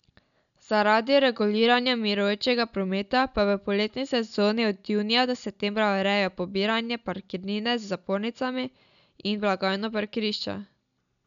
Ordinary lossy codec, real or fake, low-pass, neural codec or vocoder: none; real; 7.2 kHz; none